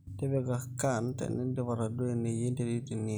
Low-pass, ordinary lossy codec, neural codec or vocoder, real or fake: none; none; none; real